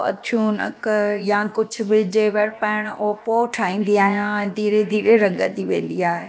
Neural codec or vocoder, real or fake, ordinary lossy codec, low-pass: codec, 16 kHz, about 1 kbps, DyCAST, with the encoder's durations; fake; none; none